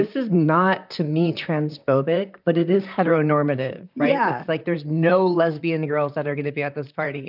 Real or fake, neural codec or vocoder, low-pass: fake; vocoder, 44.1 kHz, 128 mel bands, Pupu-Vocoder; 5.4 kHz